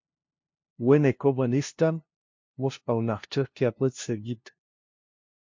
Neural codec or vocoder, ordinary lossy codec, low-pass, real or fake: codec, 16 kHz, 0.5 kbps, FunCodec, trained on LibriTTS, 25 frames a second; MP3, 48 kbps; 7.2 kHz; fake